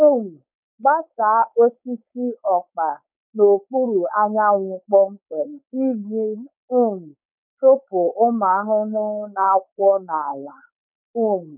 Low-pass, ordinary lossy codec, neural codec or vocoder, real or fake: 3.6 kHz; none; codec, 16 kHz, 4.8 kbps, FACodec; fake